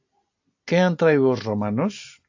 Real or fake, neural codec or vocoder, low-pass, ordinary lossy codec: real; none; 7.2 kHz; AAC, 48 kbps